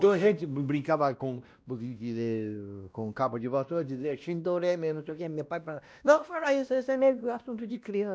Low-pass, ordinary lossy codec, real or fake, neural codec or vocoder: none; none; fake; codec, 16 kHz, 1 kbps, X-Codec, WavLM features, trained on Multilingual LibriSpeech